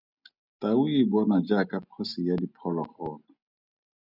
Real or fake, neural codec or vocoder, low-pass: real; none; 5.4 kHz